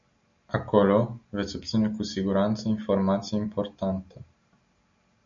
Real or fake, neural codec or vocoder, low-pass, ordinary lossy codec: real; none; 7.2 kHz; MP3, 64 kbps